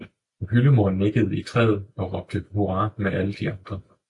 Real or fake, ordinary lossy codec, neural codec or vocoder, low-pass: real; AAC, 64 kbps; none; 10.8 kHz